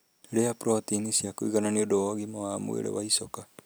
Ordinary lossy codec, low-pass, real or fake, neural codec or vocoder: none; none; real; none